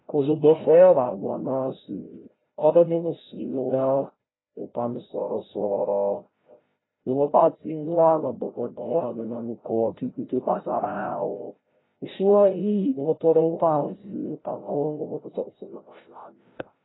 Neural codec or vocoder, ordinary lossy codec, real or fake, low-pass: codec, 16 kHz, 0.5 kbps, FreqCodec, larger model; AAC, 16 kbps; fake; 7.2 kHz